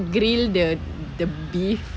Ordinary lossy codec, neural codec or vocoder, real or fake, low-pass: none; none; real; none